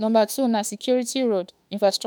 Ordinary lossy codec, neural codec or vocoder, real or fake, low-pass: none; autoencoder, 48 kHz, 32 numbers a frame, DAC-VAE, trained on Japanese speech; fake; none